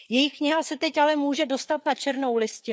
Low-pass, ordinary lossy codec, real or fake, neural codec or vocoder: none; none; fake; codec, 16 kHz, 4 kbps, FreqCodec, larger model